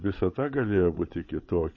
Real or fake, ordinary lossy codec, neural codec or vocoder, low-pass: fake; MP3, 32 kbps; codec, 16 kHz, 16 kbps, FreqCodec, larger model; 7.2 kHz